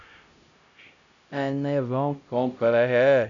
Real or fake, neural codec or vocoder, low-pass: fake; codec, 16 kHz, 0.5 kbps, X-Codec, HuBERT features, trained on LibriSpeech; 7.2 kHz